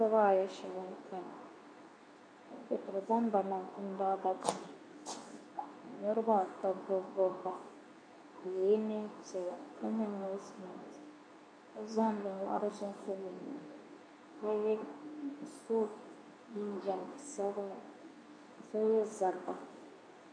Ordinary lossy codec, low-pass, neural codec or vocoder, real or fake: AAC, 48 kbps; 9.9 kHz; codec, 24 kHz, 0.9 kbps, WavTokenizer, medium speech release version 2; fake